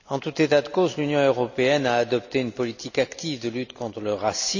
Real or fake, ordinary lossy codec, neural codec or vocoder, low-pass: real; none; none; 7.2 kHz